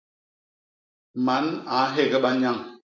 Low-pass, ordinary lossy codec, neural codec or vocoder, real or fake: 7.2 kHz; MP3, 48 kbps; none; real